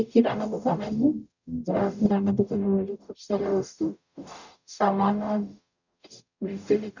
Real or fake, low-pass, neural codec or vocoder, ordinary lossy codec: fake; 7.2 kHz; codec, 44.1 kHz, 0.9 kbps, DAC; none